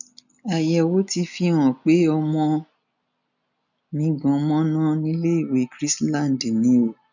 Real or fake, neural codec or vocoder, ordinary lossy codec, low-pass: real; none; none; 7.2 kHz